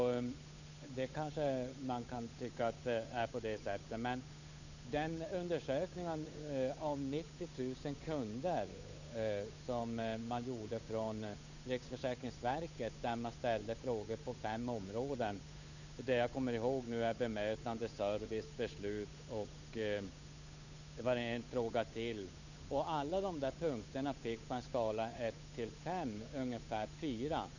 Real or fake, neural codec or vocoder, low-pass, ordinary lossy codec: fake; codec, 16 kHz, 8 kbps, FunCodec, trained on Chinese and English, 25 frames a second; 7.2 kHz; none